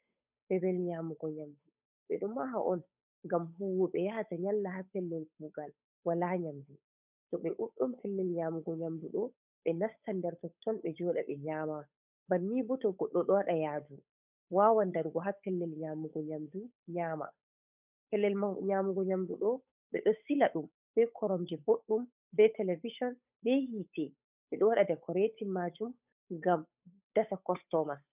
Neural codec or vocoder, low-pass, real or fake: codec, 16 kHz, 8 kbps, FunCodec, trained on Chinese and English, 25 frames a second; 3.6 kHz; fake